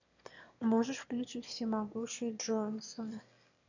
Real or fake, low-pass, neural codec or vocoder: fake; 7.2 kHz; autoencoder, 22.05 kHz, a latent of 192 numbers a frame, VITS, trained on one speaker